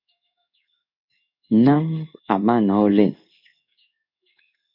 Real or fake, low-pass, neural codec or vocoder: fake; 5.4 kHz; codec, 16 kHz in and 24 kHz out, 1 kbps, XY-Tokenizer